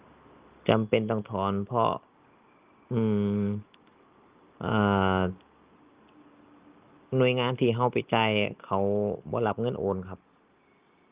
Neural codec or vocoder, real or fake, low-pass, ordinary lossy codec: none; real; 3.6 kHz; Opus, 24 kbps